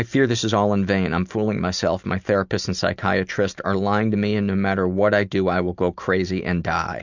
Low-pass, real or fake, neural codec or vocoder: 7.2 kHz; real; none